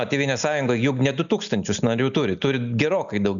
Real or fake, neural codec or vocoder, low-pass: real; none; 7.2 kHz